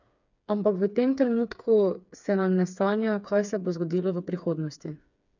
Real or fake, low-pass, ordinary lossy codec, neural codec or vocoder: fake; 7.2 kHz; none; codec, 16 kHz, 4 kbps, FreqCodec, smaller model